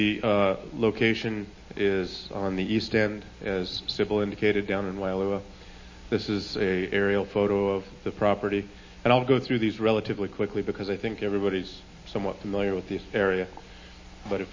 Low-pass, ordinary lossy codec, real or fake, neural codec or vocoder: 7.2 kHz; MP3, 32 kbps; real; none